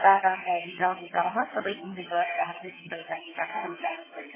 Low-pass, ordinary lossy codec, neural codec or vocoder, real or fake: 3.6 kHz; MP3, 16 kbps; vocoder, 22.05 kHz, 80 mel bands, HiFi-GAN; fake